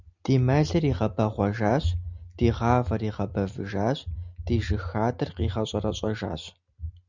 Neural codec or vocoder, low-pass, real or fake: none; 7.2 kHz; real